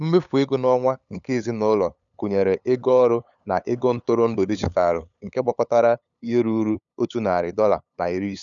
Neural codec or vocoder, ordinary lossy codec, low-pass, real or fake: codec, 16 kHz, 8 kbps, FunCodec, trained on LibriTTS, 25 frames a second; none; 7.2 kHz; fake